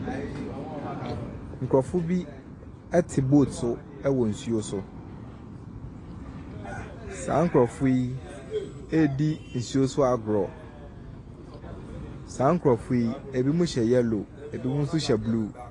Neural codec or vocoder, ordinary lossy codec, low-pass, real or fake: none; AAC, 32 kbps; 10.8 kHz; real